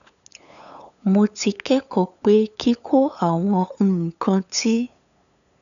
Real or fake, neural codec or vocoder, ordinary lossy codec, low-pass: fake; codec, 16 kHz, 8 kbps, FunCodec, trained on LibriTTS, 25 frames a second; none; 7.2 kHz